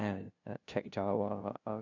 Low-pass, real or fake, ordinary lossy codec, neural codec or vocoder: 7.2 kHz; fake; none; codec, 16 kHz, 0.5 kbps, FunCodec, trained on LibriTTS, 25 frames a second